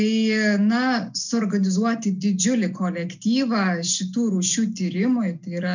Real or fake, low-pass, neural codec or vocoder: real; 7.2 kHz; none